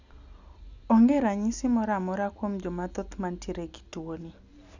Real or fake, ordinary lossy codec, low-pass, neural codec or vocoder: real; none; 7.2 kHz; none